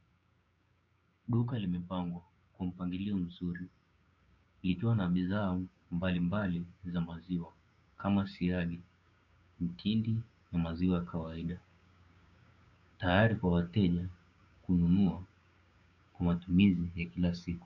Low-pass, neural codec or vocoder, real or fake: 7.2 kHz; codec, 16 kHz, 6 kbps, DAC; fake